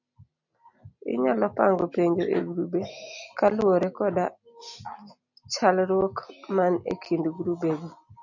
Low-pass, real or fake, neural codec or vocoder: 7.2 kHz; real; none